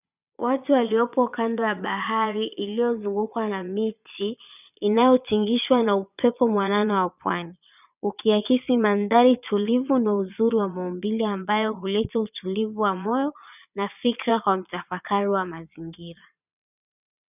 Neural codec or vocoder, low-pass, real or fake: vocoder, 22.05 kHz, 80 mel bands, Vocos; 3.6 kHz; fake